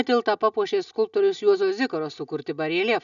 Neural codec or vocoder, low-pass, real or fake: none; 7.2 kHz; real